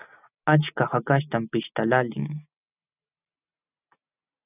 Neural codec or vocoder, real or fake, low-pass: none; real; 3.6 kHz